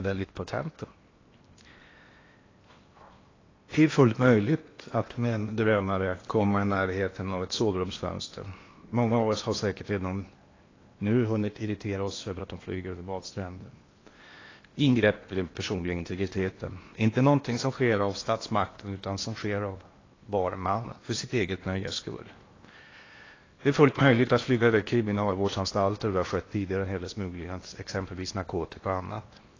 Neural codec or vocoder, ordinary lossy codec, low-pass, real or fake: codec, 16 kHz in and 24 kHz out, 0.8 kbps, FocalCodec, streaming, 65536 codes; AAC, 32 kbps; 7.2 kHz; fake